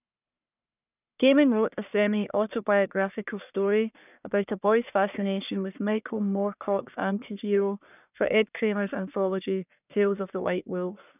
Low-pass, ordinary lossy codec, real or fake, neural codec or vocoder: 3.6 kHz; none; fake; codec, 44.1 kHz, 1.7 kbps, Pupu-Codec